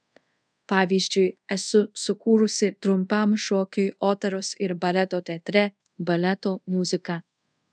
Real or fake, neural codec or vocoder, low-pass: fake; codec, 24 kHz, 0.5 kbps, DualCodec; 9.9 kHz